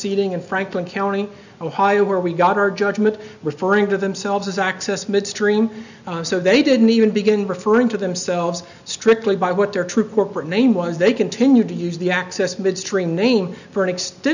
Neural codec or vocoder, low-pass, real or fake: none; 7.2 kHz; real